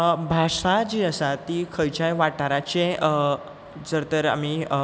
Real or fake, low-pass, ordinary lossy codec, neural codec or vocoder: real; none; none; none